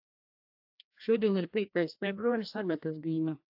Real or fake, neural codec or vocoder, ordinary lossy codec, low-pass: fake; codec, 16 kHz, 1 kbps, FreqCodec, larger model; AAC, 48 kbps; 5.4 kHz